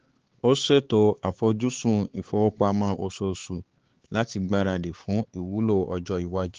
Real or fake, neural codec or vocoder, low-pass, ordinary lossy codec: fake; codec, 16 kHz, 4 kbps, X-Codec, HuBERT features, trained on LibriSpeech; 7.2 kHz; Opus, 16 kbps